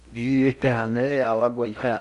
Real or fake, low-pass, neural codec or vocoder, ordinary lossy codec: fake; 10.8 kHz; codec, 16 kHz in and 24 kHz out, 0.8 kbps, FocalCodec, streaming, 65536 codes; Opus, 64 kbps